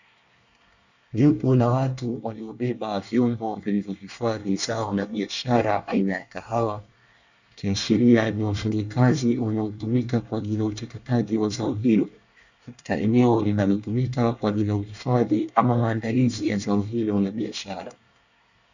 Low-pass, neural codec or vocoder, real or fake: 7.2 kHz; codec, 24 kHz, 1 kbps, SNAC; fake